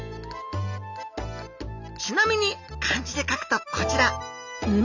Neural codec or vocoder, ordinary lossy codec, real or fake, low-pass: none; none; real; 7.2 kHz